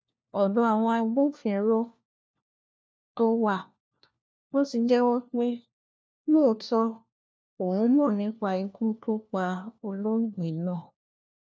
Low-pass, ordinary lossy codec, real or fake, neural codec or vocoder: none; none; fake; codec, 16 kHz, 1 kbps, FunCodec, trained on LibriTTS, 50 frames a second